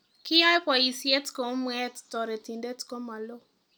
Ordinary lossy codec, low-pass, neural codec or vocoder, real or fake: none; none; none; real